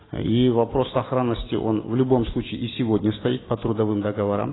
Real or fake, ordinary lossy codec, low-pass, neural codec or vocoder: real; AAC, 16 kbps; 7.2 kHz; none